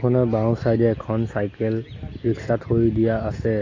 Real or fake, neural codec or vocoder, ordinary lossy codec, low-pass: real; none; AAC, 32 kbps; 7.2 kHz